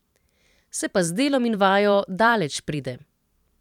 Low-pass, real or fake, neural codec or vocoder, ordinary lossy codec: 19.8 kHz; fake; vocoder, 44.1 kHz, 128 mel bands every 512 samples, BigVGAN v2; none